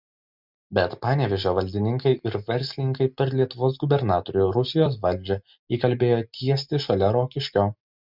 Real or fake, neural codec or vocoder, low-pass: real; none; 5.4 kHz